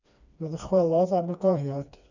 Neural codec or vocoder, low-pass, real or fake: codec, 16 kHz, 2 kbps, FreqCodec, smaller model; 7.2 kHz; fake